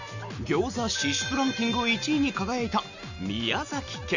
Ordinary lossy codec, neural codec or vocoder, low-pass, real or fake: none; none; 7.2 kHz; real